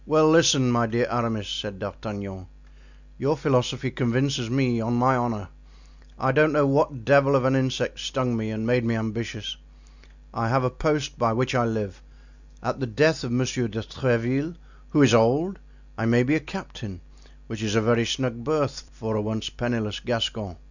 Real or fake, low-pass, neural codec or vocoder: real; 7.2 kHz; none